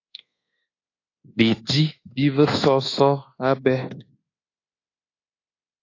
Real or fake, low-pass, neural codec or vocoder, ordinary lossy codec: fake; 7.2 kHz; codec, 24 kHz, 1.2 kbps, DualCodec; AAC, 32 kbps